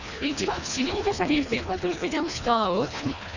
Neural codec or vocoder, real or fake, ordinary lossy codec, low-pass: codec, 24 kHz, 1.5 kbps, HILCodec; fake; none; 7.2 kHz